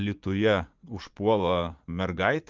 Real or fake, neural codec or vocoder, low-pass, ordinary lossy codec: fake; vocoder, 44.1 kHz, 80 mel bands, Vocos; 7.2 kHz; Opus, 32 kbps